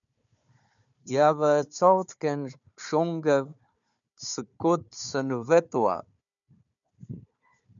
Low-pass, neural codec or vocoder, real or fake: 7.2 kHz; codec, 16 kHz, 4 kbps, FunCodec, trained on Chinese and English, 50 frames a second; fake